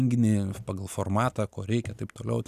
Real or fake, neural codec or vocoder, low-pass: real; none; 14.4 kHz